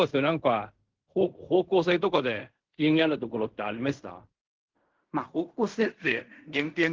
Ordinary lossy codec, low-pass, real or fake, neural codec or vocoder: Opus, 16 kbps; 7.2 kHz; fake; codec, 16 kHz in and 24 kHz out, 0.4 kbps, LongCat-Audio-Codec, fine tuned four codebook decoder